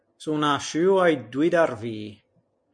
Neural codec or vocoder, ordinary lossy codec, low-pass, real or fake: none; MP3, 48 kbps; 9.9 kHz; real